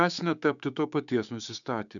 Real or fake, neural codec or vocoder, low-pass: fake; codec, 16 kHz, 6 kbps, DAC; 7.2 kHz